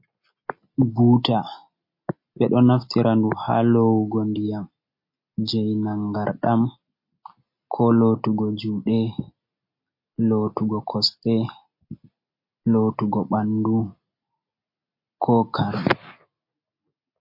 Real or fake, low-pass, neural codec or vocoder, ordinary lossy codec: real; 5.4 kHz; none; MP3, 32 kbps